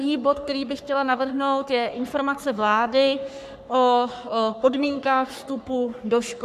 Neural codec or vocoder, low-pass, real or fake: codec, 44.1 kHz, 3.4 kbps, Pupu-Codec; 14.4 kHz; fake